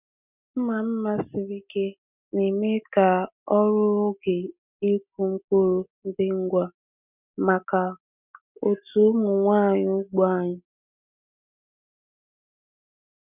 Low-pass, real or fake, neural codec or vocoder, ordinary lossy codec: 3.6 kHz; real; none; none